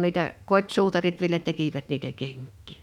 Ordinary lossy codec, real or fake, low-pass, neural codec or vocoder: none; fake; 19.8 kHz; autoencoder, 48 kHz, 32 numbers a frame, DAC-VAE, trained on Japanese speech